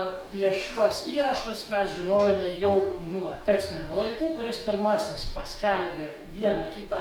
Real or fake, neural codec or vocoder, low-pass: fake; codec, 44.1 kHz, 2.6 kbps, DAC; 19.8 kHz